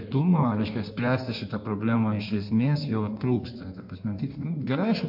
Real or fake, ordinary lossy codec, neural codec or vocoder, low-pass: fake; MP3, 48 kbps; codec, 16 kHz in and 24 kHz out, 1.1 kbps, FireRedTTS-2 codec; 5.4 kHz